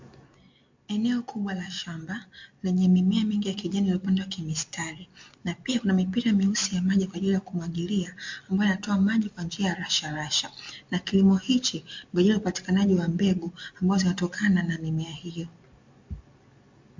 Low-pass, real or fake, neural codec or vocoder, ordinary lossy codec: 7.2 kHz; real; none; MP3, 48 kbps